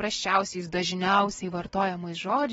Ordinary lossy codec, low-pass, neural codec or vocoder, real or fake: AAC, 24 kbps; 19.8 kHz; none; real